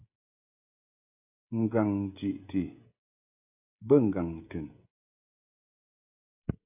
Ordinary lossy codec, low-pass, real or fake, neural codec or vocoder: AAC, 32 kbps; 3.6 kHz; fake; codec, 16 kHz, 16 kbps, FreqCodec, smaller model